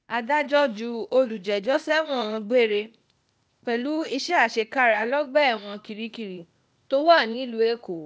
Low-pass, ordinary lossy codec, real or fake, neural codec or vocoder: none; none; fake; codec, 16 kHz, 0.8 kbps, ZipCodec